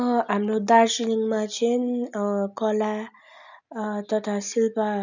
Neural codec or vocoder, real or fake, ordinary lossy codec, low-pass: none; real; none; 7.2 kHz